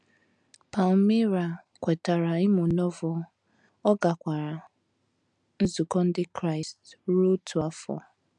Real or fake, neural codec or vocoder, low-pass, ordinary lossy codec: real; none; 9.9 kHz; MP3, 96 kbps